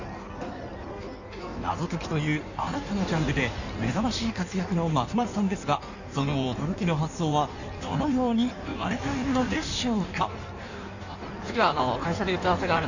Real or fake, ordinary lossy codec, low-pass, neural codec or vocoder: fake; none; 7.2 kHz; codec, 16 kHz in and 24 kHz out, 1.1 kbps, FireRedTTS-2 codec